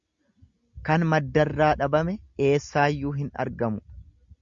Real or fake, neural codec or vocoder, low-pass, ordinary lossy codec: real; none; 7.2 kHz; Opus, 64 kbps